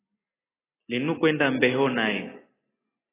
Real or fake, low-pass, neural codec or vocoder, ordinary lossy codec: real; 3.6 kHz; none; AAC, 16 kbps